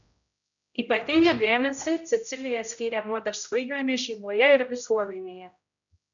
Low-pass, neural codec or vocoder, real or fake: 7.2 kHz; codec, 16 kHz, 0.5 kbps, X-Codec, HuBERT features, trained on general audio; fake